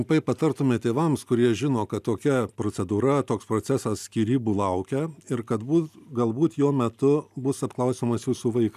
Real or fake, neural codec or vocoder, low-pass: real; none; 14.4 kHz